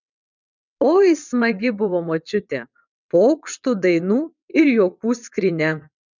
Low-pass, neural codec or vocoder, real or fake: 7.2 kHz; vocoder, 22.05 kHz, 80 mel bands, WaveNeXt; fake